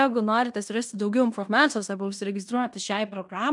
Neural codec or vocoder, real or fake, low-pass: codec, 16 kHz in and 24 kHz out, 0.9 kbps, LongCat-Audio-Codec, fine tuned four codebook decoder; fake; 10.8 kHz